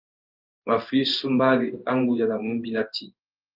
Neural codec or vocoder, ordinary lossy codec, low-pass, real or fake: codec, 16 kHz in and 24 kHz out, 1 kbps, XY-Tokenizer; Opus, 24 kbps; 5.4 kHz; fake